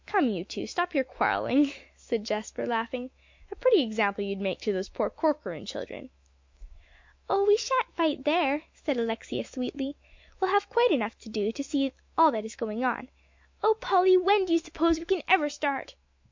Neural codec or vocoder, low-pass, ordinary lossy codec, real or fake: autoencoder, 48 kHz, 128 numbers a frame, DAC-VAE, trained on Japanese speech; 7.2 kHz; MP3, 48 kbps; fake